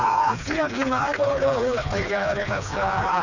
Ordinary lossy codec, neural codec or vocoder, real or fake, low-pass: none; codec, 16 kHz, 2 kbps, FreqCodec, smaller model; fake; 7.2 kHz